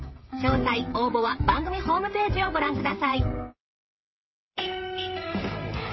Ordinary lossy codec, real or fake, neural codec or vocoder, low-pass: MP3, 24 kbps; fake; codec, 16 kHz, 16 kbps, FreqCodec, smaller model; 7.2 kHz